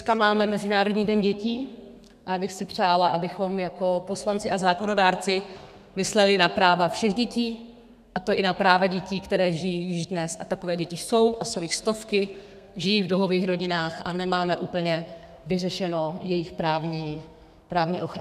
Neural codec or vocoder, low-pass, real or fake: codec, 32 kHz, 1.9 kbps, SNAC; 14.4 kHz; fake